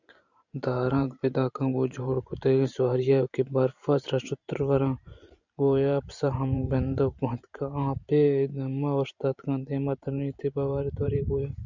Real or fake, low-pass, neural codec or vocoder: fake; 7.2 kHz; vocoder, 44.1 kHz, 128 mel bands every 512 samples, BigVGAN v2